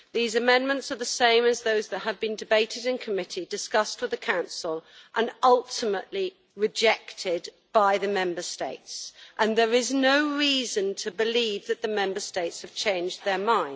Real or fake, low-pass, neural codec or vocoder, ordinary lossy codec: real; none; none; none